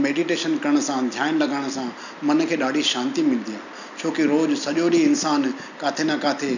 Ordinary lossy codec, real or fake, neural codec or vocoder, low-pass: none; real; none; 7.2 kHz